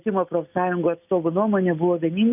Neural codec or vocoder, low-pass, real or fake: none; 3.6 kHz; real